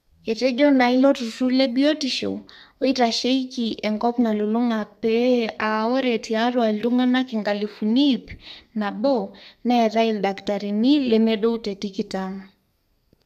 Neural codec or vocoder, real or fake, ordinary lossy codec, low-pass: codec, 32 kHz, 1.9 kbps, SNAC; fake; none; 14.4 kHz